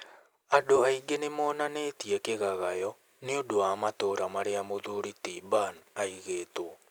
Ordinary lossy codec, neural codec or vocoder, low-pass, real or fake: none; none; none; real